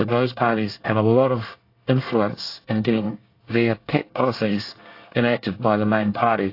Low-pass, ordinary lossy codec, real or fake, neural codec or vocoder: 5.4 kHz; AAC, 32 kbps; fake; codec, 24 kHz, 1 kbps, SNAC